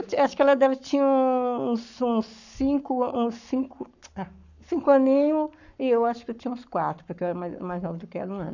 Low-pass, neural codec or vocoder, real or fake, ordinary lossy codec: 7.2 kHz; codec, 44.1 kHz, 7.8 kbps, Pupu-Codec; fake; none